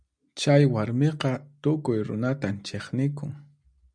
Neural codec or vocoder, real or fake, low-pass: none; real; 9.9 kHz